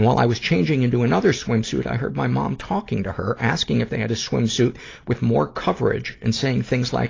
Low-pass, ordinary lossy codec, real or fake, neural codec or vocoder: 7.2 kHz; AAC, 32 kbps; real; none